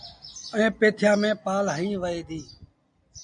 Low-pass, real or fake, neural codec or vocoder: 9.9 kHz; real; none